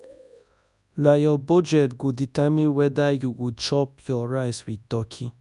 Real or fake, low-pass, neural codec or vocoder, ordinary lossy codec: fake; 10.8 kHz; codec, 24 kHz, 0.9 kbps, WavTokenizer, large speech release; none